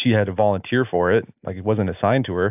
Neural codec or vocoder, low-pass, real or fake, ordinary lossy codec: none; 3.6 kHz; real; AAC, 32 kbps